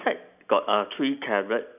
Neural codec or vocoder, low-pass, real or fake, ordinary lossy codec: none; 3.6 kHz; real; none